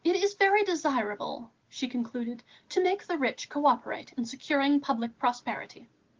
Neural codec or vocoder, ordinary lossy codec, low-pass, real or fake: none; Opus, 16 kbps; 7.2 kHz; real